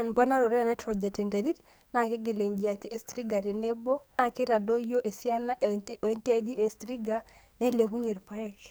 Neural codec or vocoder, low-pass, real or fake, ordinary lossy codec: codec, 44.1 kHz, 2.6 kbps, SNAC; none; fake; none